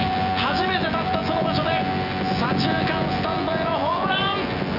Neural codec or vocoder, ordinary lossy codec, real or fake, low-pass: vocoder, 24 kHz, 100 mel bands, Vocos; none; fake; 5.4 kHz